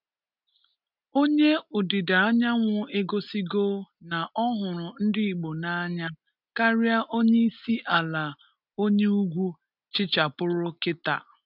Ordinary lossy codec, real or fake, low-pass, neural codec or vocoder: none; real; 5.4 kHz; none